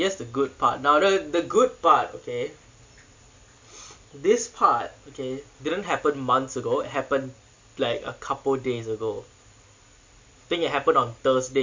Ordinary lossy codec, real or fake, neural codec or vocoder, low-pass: MP3, 48 kbps; real; none; 7.2 kHz